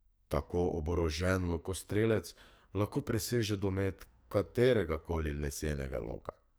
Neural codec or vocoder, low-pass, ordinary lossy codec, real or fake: codec, 44.1 kHz, 2.6 kbps, SNAC; none; none; fake